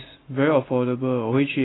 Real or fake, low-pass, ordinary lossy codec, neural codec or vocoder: real; 7.2 kHz; AAC, 16 kbps; none